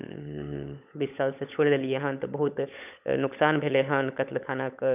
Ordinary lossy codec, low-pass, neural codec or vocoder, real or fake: none; 3.6 kHz; codec, 16 kHz, 16 kbps, FunCodec, trained on LibriTTS, 50 frames a second; fake